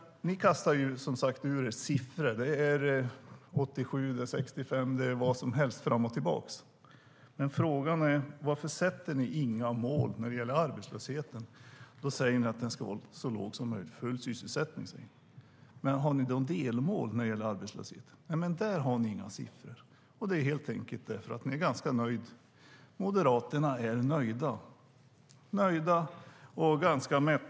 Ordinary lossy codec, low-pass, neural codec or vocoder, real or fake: none; none; none; real